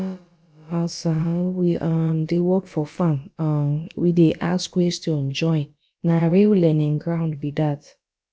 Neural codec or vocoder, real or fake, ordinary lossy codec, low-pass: codec, 16 kHz, about 1 kbps, DyCAST, with the encoder's durations; fake; none; none